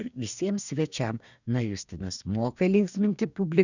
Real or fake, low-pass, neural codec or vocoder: fake; 7.2 kHz; codec, 44.1 kHz, 2.6 kbps, DAC